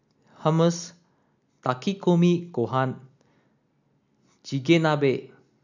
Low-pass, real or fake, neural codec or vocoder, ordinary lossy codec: 7.2 kHz; real; none; none